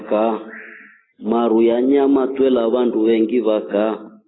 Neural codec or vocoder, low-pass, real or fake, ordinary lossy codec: none; 7.2 kHz; real; AAC, 16 kbps